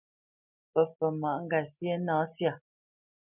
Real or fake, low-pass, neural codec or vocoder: real; 3.6 kHz; none